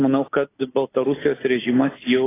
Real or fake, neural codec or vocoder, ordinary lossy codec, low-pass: real; none; AAC, 16 kbps; 3.6 kHz